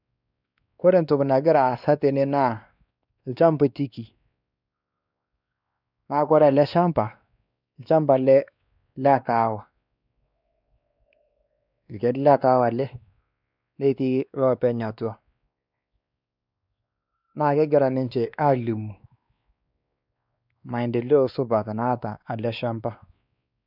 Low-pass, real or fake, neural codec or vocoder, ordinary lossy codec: 5.4 kHz; fake; codec, 16 kHz, 2 kbps, X-Codec, WavLM features, trained on Multilingual LibriSpeech; none